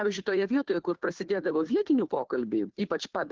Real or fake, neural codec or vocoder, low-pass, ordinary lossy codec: fake; codec, 16 kHz, 2 kbps, FunCodec, trained on Chinese and English, 25 frames a second; 7.2 kHz; Opus, 16 kbps